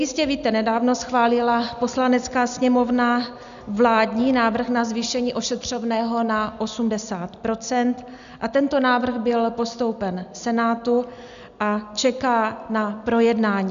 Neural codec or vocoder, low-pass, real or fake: none; 7.2 kHz; real